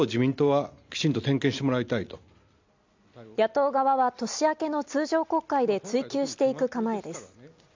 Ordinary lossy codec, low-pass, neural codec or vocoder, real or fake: MP3, 64 kbps; 7.2 kHz; none; real